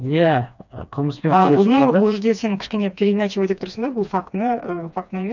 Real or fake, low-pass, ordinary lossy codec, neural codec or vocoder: fake; 7.2 kHz; none; codec, 16 kHz, 2 kbps, FreqCodec, smaller model